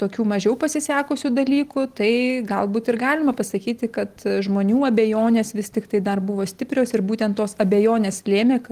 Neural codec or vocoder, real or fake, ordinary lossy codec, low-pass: none; real; Opus, 24 kbps; 14.4 kHz